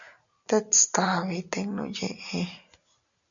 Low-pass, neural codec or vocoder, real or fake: 7.2 kHz; none; real